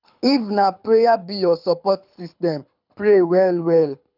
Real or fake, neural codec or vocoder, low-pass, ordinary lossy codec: fake; codec, 24 kHz, 6 kbps, HILCodec; 5.4 kHz; none